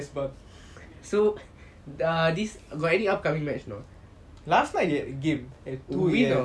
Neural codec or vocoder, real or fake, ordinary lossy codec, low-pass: none; real; none; none